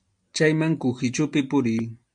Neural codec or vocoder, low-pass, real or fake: none; 9.9 kHz; real